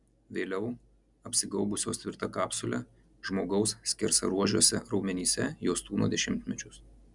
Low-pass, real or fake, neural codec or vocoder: 10.8 kHz; real; none